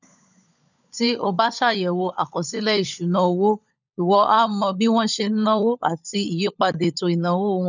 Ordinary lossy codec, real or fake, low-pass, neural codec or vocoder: none; fake; 7.2 kHz; codec, 16 kHz, 16 kbps, FunCodec, trained on LibriTTS, 50 frames a second